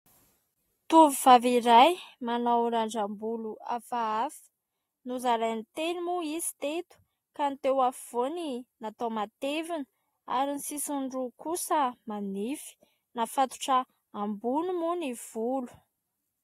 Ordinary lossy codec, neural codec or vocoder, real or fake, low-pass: AAC, 48 kbps; none; real; 19.8 kHz